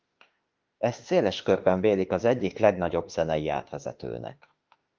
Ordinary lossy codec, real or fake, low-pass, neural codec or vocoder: Opus, 32 kbps; fake; 7.2 kHz; autoencoder, 48 kHz, 32 numbers a frame, DAC-VAE, trained on Japanese speech